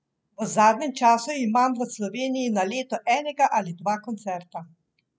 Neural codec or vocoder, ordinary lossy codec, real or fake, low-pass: none; none; real; none